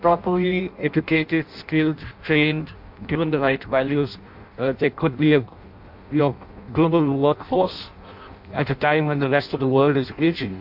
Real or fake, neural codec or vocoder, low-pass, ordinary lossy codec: fake; codec, 16 kHz in and 24 kHz out, 0.6 kbps, FireRedTTS-2 codec; 5.4 kHz; MP3, 48 kbps